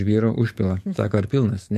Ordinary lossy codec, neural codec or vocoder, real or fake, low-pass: MP3, 64 kbps; codec, 44.1 kHz, 7.8 kbps, DAC; fake; 14.4 kHz